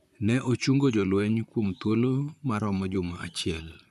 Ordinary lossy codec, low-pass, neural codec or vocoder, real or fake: none; 14.4 kHz; vocoder, 44.1 kHz, 128 mel bands, Pupu-Vocoder; fake